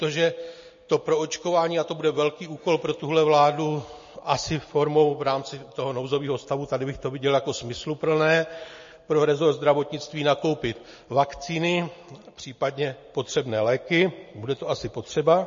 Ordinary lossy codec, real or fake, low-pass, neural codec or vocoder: MP3, 32 kbps; real; 7.2 kHz; none